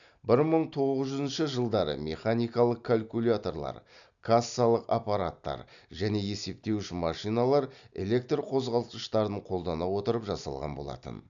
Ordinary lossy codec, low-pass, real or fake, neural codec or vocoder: none; 7.2 kHz; real; none